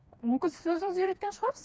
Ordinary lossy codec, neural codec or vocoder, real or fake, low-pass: none; codec, 16 kHz, 2 kbps, FreqCodec, smaller model; fake; none